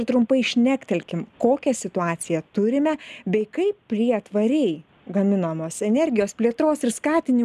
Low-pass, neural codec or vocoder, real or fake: 14.4 kHz; none; real